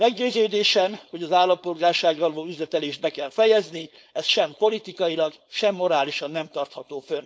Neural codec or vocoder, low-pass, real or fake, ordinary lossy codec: codec, 16 kHz, 4.8 kbps, FACodec; none; fake; none